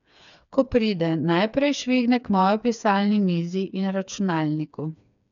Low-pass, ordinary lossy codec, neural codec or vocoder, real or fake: 7.2 kHz; none; codec, 16 kHz, 4 kbps, FreqCodec, smaller model; fake